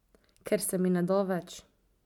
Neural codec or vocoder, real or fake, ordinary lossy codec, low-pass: none; real; none; 19.8 kHz